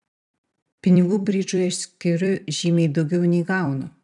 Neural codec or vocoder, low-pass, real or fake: vocoder, 44.1 kHz, 128 mel bands every 256 samples, BigVGAN v2; 10.8 kHz; fake